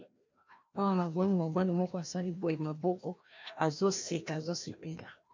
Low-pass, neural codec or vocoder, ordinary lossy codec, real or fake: 7.2 kHz; codec, 16 kHz, 1 kbps, FreqCodec, larger model; MP3, 64 kbps; fake